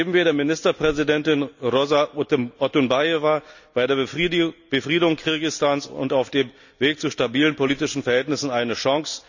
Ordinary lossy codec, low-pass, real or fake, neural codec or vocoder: none; 7.2 kHz; real; none